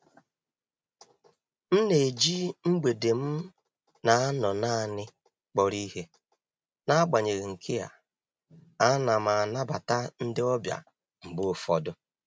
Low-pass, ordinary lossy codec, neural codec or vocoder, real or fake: none; none; none; real